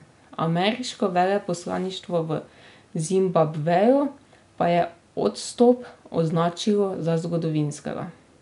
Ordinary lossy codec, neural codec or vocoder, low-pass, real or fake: none; none; 10.8 kHz; real